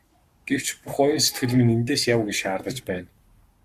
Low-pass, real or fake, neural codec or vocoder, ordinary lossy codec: 14.4 kHz; fake; codec, 44.1 kHz, 7.8 kbps, Pupu-Codec; Opus, 64 kbps